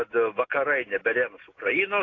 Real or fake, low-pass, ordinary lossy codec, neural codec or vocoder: real; 7.2 kHz; AAC, 32 kbps; none